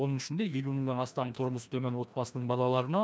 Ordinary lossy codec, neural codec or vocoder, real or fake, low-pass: none; codec, 16 kHz, 1 kbps, FreqCodec, larger model; fake; none